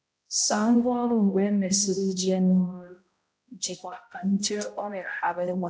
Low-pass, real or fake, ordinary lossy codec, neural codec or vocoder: none; fake; none; codec, 16 kHz, 0.5 kbps, X-Codec, HuBERT features, trained on balanced general audio